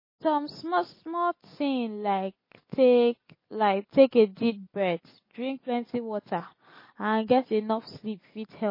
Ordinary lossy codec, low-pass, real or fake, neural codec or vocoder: MP3, 24 kbps; 5.4 kHz; real; none